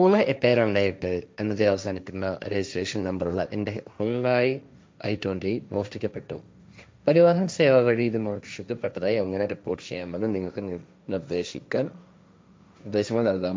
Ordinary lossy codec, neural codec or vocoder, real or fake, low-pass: none; codec, 16 kHz, 1.1 kbps, Voila-Tokenizer; fake; none